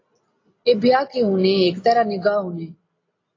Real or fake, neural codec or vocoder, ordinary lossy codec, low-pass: real; none; AAC, 32 kbps; 7.2 kHz